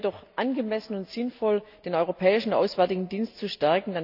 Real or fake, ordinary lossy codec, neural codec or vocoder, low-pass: real; none; none; 5.4 kHz